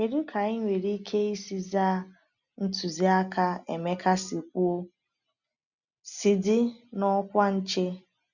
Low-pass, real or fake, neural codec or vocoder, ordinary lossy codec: 7.2 kHz; real; none; none